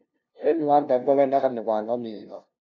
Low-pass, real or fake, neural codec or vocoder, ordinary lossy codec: 7.2 kHz; fake; codec, 16 kHz, 0.5 kbps, FunCodec, trained on LibriTTS, 25 frames a second; none